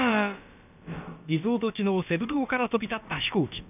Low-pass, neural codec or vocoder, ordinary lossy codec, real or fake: 3.6 kHz; codec, 16 kHz, about 1 kbps, DyCAST, with the encoder's durations; none; fake